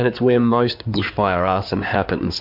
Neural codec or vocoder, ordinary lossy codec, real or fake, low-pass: codec, 16 kHz in and 24 kHz out, 2.2 kbps, FireRedTTS-2 codec; MP3, 48 kbps; fake; 5.4 kHz